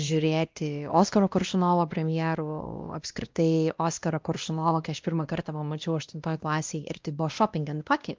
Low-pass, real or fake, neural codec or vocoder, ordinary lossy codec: 7.2 kHz; fake; codec, 16 kHz, 1 kbps, X-Codec, WavLM features, trained on Multilingual LibriSpeech; Opus, 24 kbps